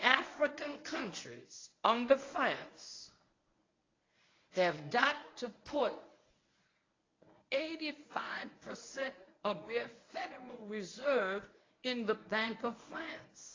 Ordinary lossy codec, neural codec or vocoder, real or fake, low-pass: AAC, 32 kbps; codec, 24 kHz, 0.9 kbps, WavTokenizer, medium speech release version 1; fake; 7.2 kHz